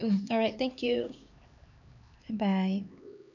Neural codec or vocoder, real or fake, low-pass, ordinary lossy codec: codec, 16 kHz, 2 kbps, X-Codec, HuBERT features, trained on LibriSpeech; fake; 7.2 kHz; none